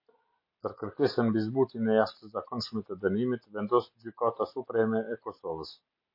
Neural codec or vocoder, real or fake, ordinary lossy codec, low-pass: none; real; MP3, 32 kbps; 5.4 kHz